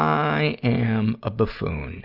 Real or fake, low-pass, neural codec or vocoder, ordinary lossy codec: real; 5.4 kHz; none; Opus, 64 kbps